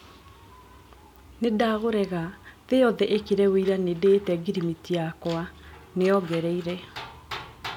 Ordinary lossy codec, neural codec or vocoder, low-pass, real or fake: none; none; 19.8 kHz; real